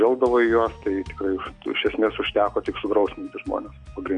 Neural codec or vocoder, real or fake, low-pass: none; real; 9.9 kHz